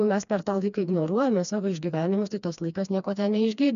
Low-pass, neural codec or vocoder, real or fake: 7.2 kHz; codec, 16 kHz, 2 kbps, FreqCodec, smaller model; fake